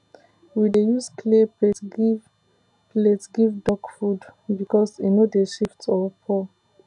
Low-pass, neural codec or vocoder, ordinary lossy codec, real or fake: 10.8 kHz; none; none; real